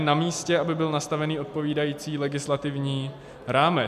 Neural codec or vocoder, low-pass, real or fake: none; 14.4 kHz; real